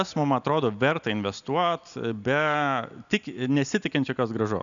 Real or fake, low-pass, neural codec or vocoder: real; 7.2 kHz; none